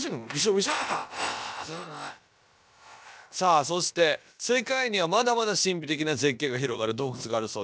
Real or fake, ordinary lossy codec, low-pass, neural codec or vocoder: fake; none; none; codec, 16 kHz, about 1 kbps, DyCAST, with the encoder's durations